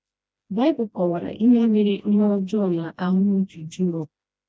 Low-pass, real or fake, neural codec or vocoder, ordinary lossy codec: none; fake; codec, 16 kHz, 1 kbps, FreqCodec, smaller model; none